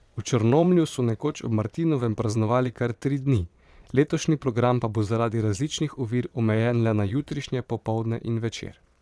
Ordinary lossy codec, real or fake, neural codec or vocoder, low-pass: none; fake; vocoder, 22.05 kHz, 80 mel bands, Vocos; none